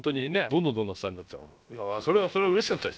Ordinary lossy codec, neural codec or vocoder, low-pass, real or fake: none; codec, 16 kHz, about 1 kbps, DyCAST, with the encoder's durations; none; fake